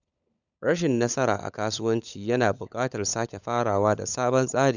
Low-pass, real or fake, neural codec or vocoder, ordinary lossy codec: 7.2 kHz; fake; codec, 16 kHz, 16 kbps, FunCodec, trained on LibriTTS, 50 frames a second; none